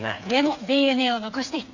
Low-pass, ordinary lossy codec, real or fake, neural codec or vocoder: 7.2 kHz; none; fake; codec, 16 kHz, 4 kbps, FreqCodec, smaller model